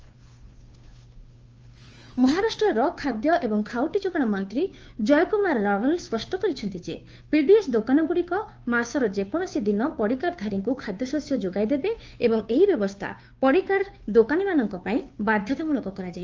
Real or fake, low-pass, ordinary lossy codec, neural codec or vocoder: fake; 7.2 kHz; Opus, 24 kbps; codec, 16 kHz, 2 kbps, FunCodec, trained on Chinese and English, 25 frames a second